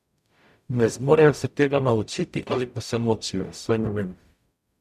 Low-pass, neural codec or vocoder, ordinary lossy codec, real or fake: 14.4 kHz; codec, 44.1 kHz, 0.9 kbps, DAC; none; fake